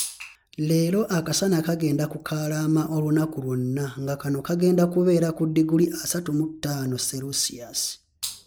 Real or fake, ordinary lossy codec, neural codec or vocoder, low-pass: real; none; none; none